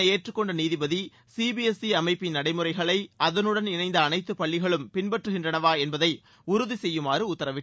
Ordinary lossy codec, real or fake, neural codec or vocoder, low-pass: none; real; none; none